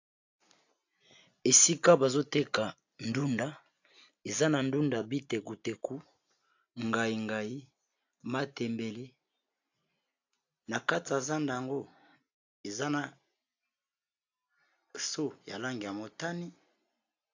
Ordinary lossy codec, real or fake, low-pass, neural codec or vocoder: AAC, 48 kbps; real; 7.2 kHz; none